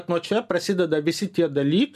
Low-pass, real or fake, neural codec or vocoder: 14.4 kHz; real; none